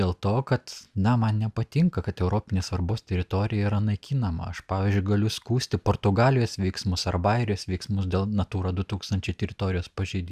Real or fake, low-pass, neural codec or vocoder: real; 14.4 kHz; none